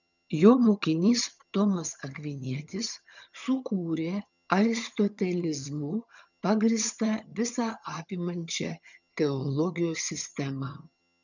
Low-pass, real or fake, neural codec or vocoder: 7.2 kHz; fake; vocoder, 22.05 kHz, 80 mel bands, HiFi-GAN